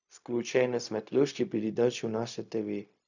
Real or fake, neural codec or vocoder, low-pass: fake; codec, 16 kHz, 0.4 kbps, LongCat-Audio-Codec; 7.2 kHz